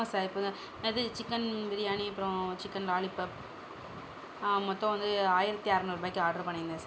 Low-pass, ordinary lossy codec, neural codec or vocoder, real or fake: none; none; none; real